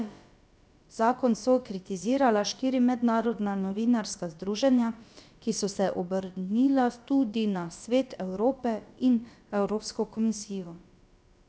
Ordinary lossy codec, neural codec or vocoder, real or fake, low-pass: none; codec, 16 kHz, about 1 kbps, DyCAST, with the encoder's durations; fake; none